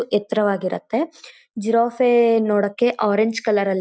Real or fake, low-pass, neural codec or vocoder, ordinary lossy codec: real; none; none; none